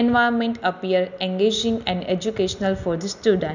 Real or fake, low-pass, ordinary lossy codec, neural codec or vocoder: real; 7.2 kHz; none; none